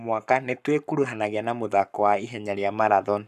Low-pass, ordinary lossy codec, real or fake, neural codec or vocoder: 14.4 kHz; none; fake; codec, 44.1 kHz, 7.8 kbps, Pupu-Codec